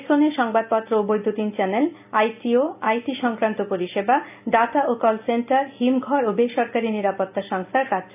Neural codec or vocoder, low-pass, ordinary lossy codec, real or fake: none; 3.6 kHz; AAC, 32 kbps; real